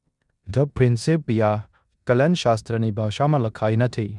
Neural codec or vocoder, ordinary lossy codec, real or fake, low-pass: codec, 16 kHz in and 24 kHz out, 0.9 kbps, LongCat-Audio-Codec, four codebook decoder; none; fake; 10.8 kHz